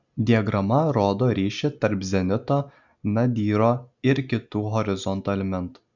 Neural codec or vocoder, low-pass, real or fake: none; 7.2 kHz; real